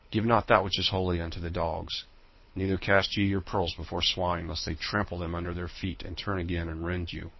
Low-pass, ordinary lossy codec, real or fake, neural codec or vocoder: 7.2 kHz; MP3, 24 kbps; fake; codec, 24 kHz, 6 kbps, HILCodec